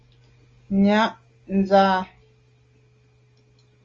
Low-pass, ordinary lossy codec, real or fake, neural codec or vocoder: 7.2 kHz; Opus, 32 kbps; real; none